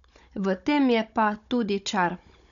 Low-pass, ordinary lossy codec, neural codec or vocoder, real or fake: 7.2 kHz; none; codec, 16 kHz, 16 kbps, FunCodec, trained on Chinese and English, 50 frames a second; fake